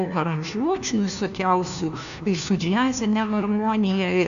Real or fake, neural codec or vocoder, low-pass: fake; codec, 16 kHz, 1 kbps, FunCodec, trained on LibriTTS, 50 frames a second; 7.2 kHz